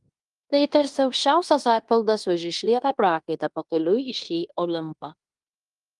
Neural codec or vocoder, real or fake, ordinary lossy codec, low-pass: codec, 16 kHz in and 24 kHz out, 0.9 kbps, LongCat-Audio-Codec, fine tuned four codebook decoder; fake; Opus, 32 kbps; 10.8 kHz